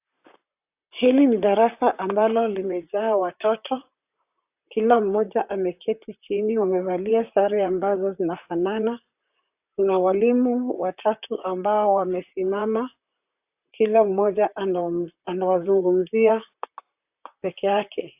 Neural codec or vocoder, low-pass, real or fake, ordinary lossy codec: vocoder, 44.1 kHz, 128 mel bands, Pupu-Vocoder; 3.6 kHz; fake; AAC, 32 kbps